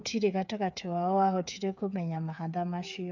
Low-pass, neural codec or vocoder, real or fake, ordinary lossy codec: 7.2 kHz; codec, 16 kHz, 8 kbps, FreqCodec, smaller model; fake; none